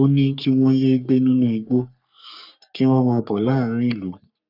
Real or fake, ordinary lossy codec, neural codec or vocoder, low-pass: fake; none; codec, 44.1 kHz, 3.4 kbps, Pupu-Codec; 5.4 kHz